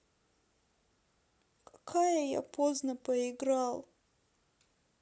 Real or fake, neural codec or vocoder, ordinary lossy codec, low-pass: real; none; none; none